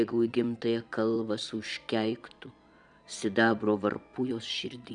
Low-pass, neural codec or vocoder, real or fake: 9.9 kHz; vocoder, 22.05 kHz, 80 mel bands, Vocos; fake